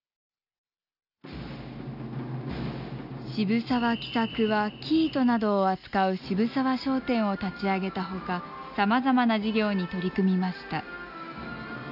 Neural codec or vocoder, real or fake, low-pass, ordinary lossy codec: none; real; 5.4 kHz; none